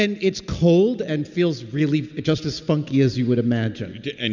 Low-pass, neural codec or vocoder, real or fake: 7.2 kHz; none; real